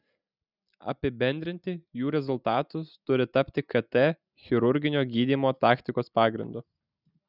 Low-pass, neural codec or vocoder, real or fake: 5.4 kHz; none; real